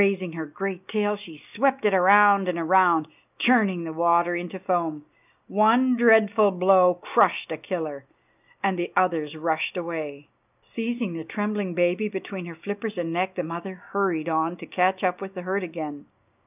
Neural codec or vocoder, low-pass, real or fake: none; 3.6 kHz; real